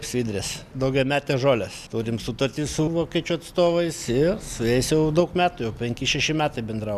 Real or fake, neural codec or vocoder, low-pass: real; none; 14.4 kHz